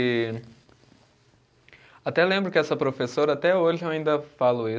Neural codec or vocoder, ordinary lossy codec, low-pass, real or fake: none; none; none; real